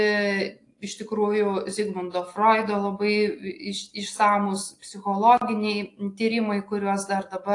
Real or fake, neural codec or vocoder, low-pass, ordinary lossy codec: real; none; 10.8 kHz; AAC, 48 kbps